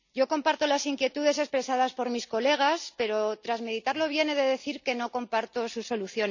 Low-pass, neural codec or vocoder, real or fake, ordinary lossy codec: 7.2 kHz; none; real; none